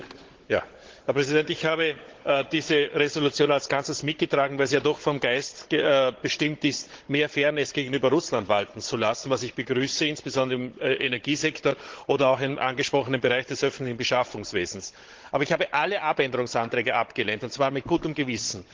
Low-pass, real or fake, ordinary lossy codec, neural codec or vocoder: 7.2 kHz; fake; Opus, 16 kbps; codec, 16 kHz, 16 kbps, FunCodec, trained on Chinese and English, 50 frames a second